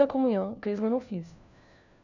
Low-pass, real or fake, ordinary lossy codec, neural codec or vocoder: 7.2 kHz; fake; none; codec, 16 kHz, 1 kbps, FunCodec, trained on LibriTTS, 50 frames a second